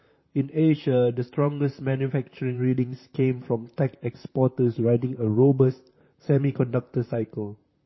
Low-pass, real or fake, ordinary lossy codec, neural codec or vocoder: 7.2 kHz; fake; MP3, 24 kbps; vocoder, 44.1 kHz, 128 mel bands, Pupu-Vocoder